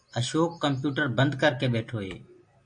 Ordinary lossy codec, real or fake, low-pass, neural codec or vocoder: MP3, 48 kbps; real; 9.9 kHz; none